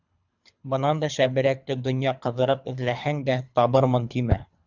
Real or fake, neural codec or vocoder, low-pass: fake; codec, 24 kHz, 3 kbps, HILCodec; 7.2 kHz